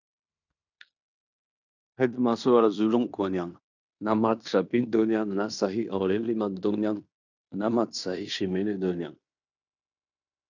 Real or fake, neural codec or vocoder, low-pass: fake; codec, 16 kHz in and 24 kHz out, 0.9 kbps, LongCat-Audio-Codec, fine tuned four codebook decoder; 7.2 kHz